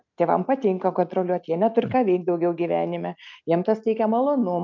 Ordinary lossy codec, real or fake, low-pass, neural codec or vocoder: MP3, 64 kbps; real; 7.2 kHz; none